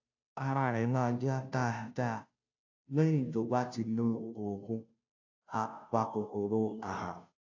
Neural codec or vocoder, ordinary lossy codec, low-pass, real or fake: codec, 16 kHz, 0.5 kbps, FunCodec, trained on Chinese and English, 25 frames a second; AAC, 48 kbps; 7.2 kHz; fake